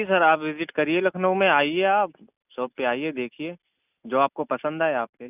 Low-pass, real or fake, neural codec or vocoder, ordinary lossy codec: 3.6 kHz; real; none; none